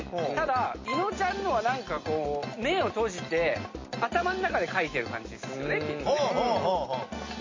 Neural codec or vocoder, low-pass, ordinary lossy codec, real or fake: none; 7.2 kHz; MP3, 32 kbps; real